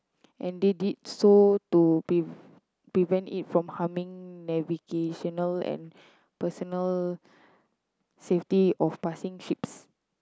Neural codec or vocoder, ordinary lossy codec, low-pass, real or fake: none; none; none; real